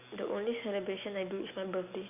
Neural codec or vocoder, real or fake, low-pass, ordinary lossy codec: none; real; 3.6 kHz; none